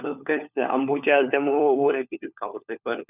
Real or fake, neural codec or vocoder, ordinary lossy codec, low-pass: fake; codec, 16 kHz, 4 kbps, FunCodec, trained on LibriTTS, 50 frames a second; none; 3.6 kHz